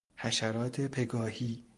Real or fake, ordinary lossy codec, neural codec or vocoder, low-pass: fake; Opus, 32 kbps; vocoder, 44.1 kHz, 128 mel bands every 512 samples, BigVGAN v2; 10.8 kHz